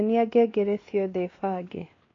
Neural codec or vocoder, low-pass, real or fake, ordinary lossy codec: codec, 16 kHz, 2 kbps, X-Codec, WavLM features, trained on Multilingual LibriSpeech; 7.2 kHz; fake; AAC, 32 kbps